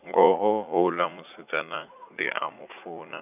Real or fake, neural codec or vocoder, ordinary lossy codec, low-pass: fake; vocoder, 44.1 kHz, 80 mel bands, Vocos; none; 3.6 kHz